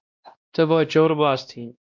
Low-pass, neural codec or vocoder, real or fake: 7.2 kHz; codec, 16 kHz, 1 kbps, X-Codec, WavLM features, trained on Multilingual LibriSpeech; fake